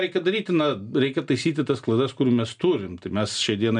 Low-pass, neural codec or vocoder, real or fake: 9.9 kHz; none; real